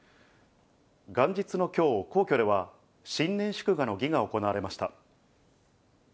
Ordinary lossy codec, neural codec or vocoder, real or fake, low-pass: none; none; real; none